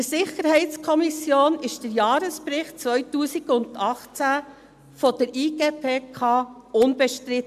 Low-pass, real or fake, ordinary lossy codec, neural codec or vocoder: 14.4 kHz; real; MP3, 96 kbps; none